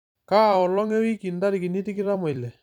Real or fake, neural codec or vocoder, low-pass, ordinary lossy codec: fake; vocoder, 44.1 kHz, 128 mel bands every 256 samples, BigVGAN v2; 19.8 kHz; none